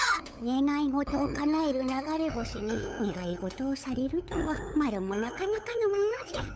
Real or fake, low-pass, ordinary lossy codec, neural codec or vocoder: fake; none; none; codec, 16 kHz, 8 kbps, FunCodec, trained on LibriTTS, 25 frames a second